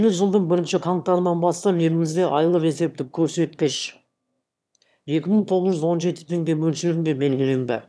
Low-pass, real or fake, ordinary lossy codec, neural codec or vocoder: none; fake; none; autoencoder, 22.05 kHz, a latent of 192 numbers a frame, VITS, trained on one speaker